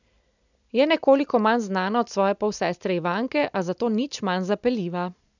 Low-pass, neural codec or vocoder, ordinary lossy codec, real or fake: 7.2 kHz; none; none; real